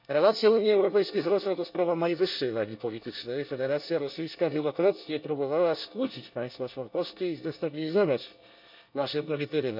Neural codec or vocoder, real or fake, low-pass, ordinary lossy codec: codec, 24 kHz, 1 kbps, SNAC; fake; 5.4 kHz; none